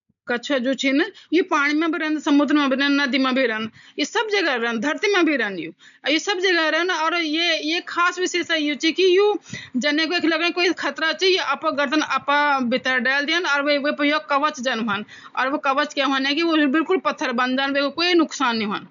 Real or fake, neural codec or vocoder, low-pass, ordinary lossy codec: real; none; 7.2 kHz; none